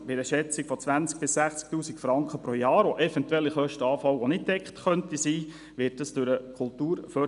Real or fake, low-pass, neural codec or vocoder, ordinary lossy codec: real; 10.8 kHz; none; none